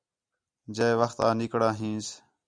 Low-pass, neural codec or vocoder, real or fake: 9.9 kHz; none; real